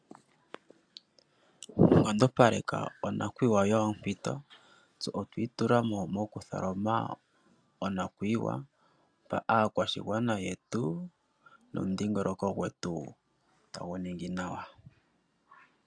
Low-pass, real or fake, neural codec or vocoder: 9.9 kHz; real; none